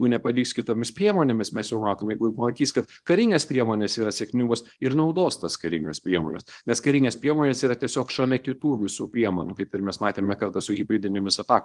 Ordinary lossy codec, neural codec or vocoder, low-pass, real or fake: Opus, 24 kbps; codec, 24 kHz, 0.9 kbps, WavTokenizer, small release; 10.8 kHz; fake